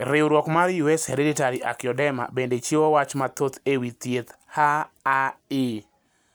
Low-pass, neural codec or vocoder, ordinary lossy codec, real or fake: none; none; none; real